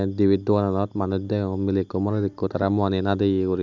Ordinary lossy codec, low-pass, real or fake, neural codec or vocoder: none; 7.2 kHz; real; none